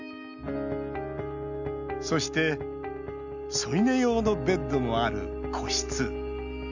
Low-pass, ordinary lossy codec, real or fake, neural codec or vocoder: 7.2 kHz; none; real; none